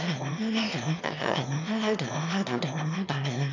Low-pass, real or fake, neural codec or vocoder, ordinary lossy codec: 7.2 kHz; fake; autoencoder, 22.05 kHz, a latent of 192 numbers a frame, VITS, trained on one speaker; none